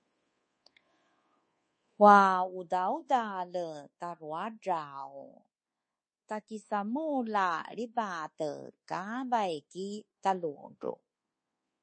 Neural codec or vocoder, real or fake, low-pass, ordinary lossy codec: codec, 24 kHz, 1.2 kbps, DualCodec; fake; 9.9 kHz; MP3, 32 kbps